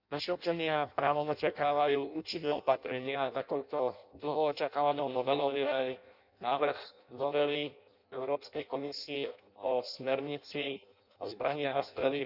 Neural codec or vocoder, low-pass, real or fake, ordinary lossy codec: codec, 16 kHz in and 24 kHz out, 0.6 kbps, FireRedTTS-2 codec; 5.4 kHz; fake; none